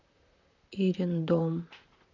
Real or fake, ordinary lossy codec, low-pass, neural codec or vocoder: fake; none; 7.2 kHz; vocoder, 44.1 kHz, 128 mel bands, Pupu-Vocoder